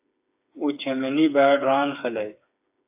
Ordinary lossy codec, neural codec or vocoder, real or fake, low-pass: AAC, 32 kbps; codec, 16 kHz, 4 kbps, FreqCodec, smaller model; fake; 3.6 kHz